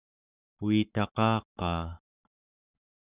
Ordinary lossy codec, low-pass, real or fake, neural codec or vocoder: Opus, 64 kbps; 3.6 kHz; fake; codec, 44.1 kHz, 7.8 kbps, Pupu-Codec